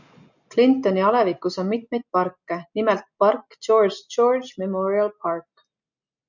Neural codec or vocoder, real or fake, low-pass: none; real; 7.2 kHz